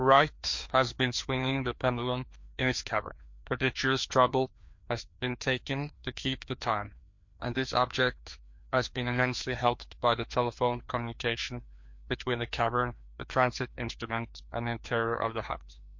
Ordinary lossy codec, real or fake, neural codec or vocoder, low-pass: MP3, 48 kbps; fake; codec, 16 kHz, 2 kbps, FreqCodec, larger model; 7.2 kHz